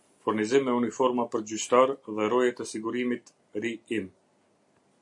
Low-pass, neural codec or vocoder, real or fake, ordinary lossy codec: 10.8 kHz; none; real; MP3, 48 kbps